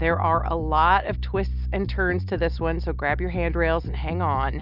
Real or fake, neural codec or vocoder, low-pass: real; none; 5.4 kHz